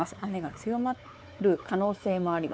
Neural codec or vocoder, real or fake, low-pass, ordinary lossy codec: codec, 16 kHz, 4 kbps, X-Codec, WavLM features, trained on Multilingual LibriSpeech; fake; none; none